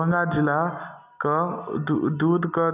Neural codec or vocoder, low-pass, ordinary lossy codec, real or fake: none; 3.6 kHz; none; real